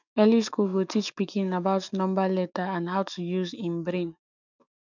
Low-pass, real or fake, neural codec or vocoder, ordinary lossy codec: 7.2 kHz; fake; autoencoder, 48 kHz, 128 numbers a frame, DAC-VAE, trained on Japanese speech; none